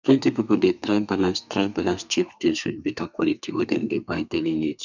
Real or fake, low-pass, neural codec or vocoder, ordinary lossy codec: fake; 7.2 kHz; codec, 32 kHz, 1.9 kbps, SNAC; none